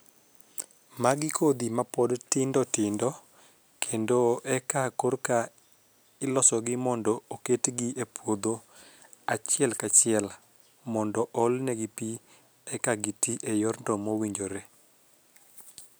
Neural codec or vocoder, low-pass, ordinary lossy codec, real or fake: none; none; none; real